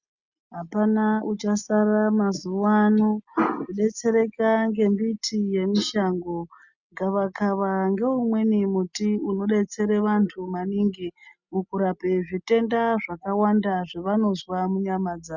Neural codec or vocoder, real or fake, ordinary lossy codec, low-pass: none; real; Opus, 64 kbps; 7.2 kHz